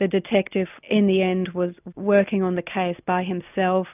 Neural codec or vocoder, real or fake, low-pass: none; real; 3.6 kHz